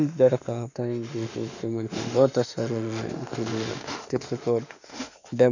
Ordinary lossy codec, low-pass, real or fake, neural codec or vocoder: none; 7.2 kHz; fake; autoencoder, 48 kHz, 32 numbers a frame, DAC-VAE, trained on Japanese speech